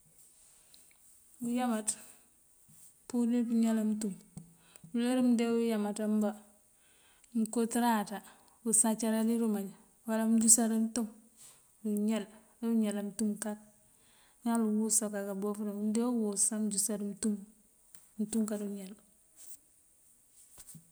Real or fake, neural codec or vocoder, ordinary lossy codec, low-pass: real; none; none; none